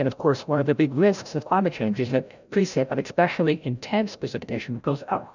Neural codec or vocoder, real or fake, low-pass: codec, 16 kHz, 0.5 kbps, FreqCodec, larger model; fake; 7.2 kHz